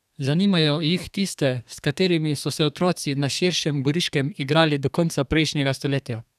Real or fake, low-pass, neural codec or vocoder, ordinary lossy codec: fake; 14.4 kHz; codec, 32 kHz, 1.9 kbps, SNAC; none